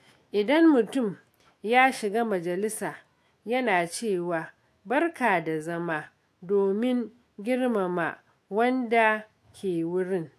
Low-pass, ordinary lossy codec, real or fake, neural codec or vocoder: 14.4 kHz; AAC, 64 kbps; fake; autoencoder, 48 kHz, 128 numbers a frame, DAC-VAE, trained on Japanese speech